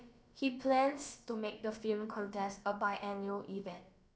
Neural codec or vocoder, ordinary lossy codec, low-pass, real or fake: codec, 16 kHz, about 1 kbps, DyCAST, with the encoder's durations; none; none; fake